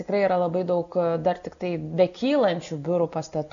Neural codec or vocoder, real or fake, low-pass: none; real; 7.2 kHz